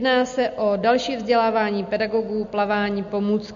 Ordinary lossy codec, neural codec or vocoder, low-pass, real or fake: MP3, 48 kbps; none; 7.2 kHz; real